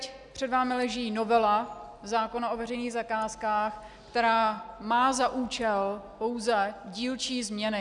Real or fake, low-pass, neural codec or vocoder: real; 10.8 kHz; none